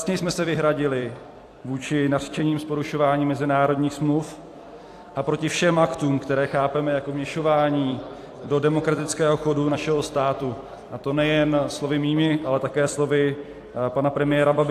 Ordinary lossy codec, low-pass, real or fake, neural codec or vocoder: AAC, 64 kbps; 14.4 kHz; fake; vocoder, 44.1 kHz, 128 mel bands every 256 samples, BigVGAN v2